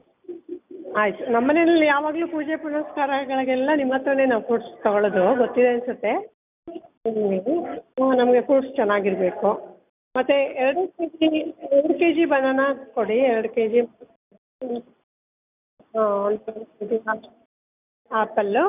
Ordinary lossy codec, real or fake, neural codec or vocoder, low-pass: none; real; none; 3.6 kHz